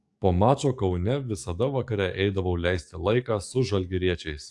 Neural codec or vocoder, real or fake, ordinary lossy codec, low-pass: codec, 44.1 kHz, 7.8 kbps, DAC; fake; AAC, 64 kbps; 10.8 kHz